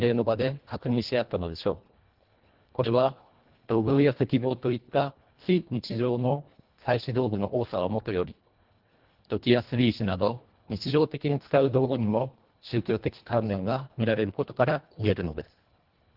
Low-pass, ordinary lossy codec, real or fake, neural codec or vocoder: 5.4 kHz; Opus, 16 kbps; fake; codec, 24 kHz, 1.5 kbps, HILCodec